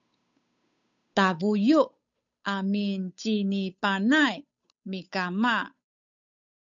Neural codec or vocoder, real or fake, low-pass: codec, 16 kHz, 8 kbps, FunCodec, trained on Chinese and English, 25 frames a second; fake; 7.2 kHz